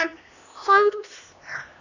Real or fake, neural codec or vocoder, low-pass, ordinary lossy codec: fake; codec, 16 kHz, 1 kbps, X-Codec, HuBERT features, trained on LibriSpeech; 7.2 kHz; none